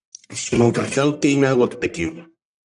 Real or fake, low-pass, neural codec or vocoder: fake; 10.8 kHz; codec, 44.1 kHz, 1.7 kbps, Pupu-Codec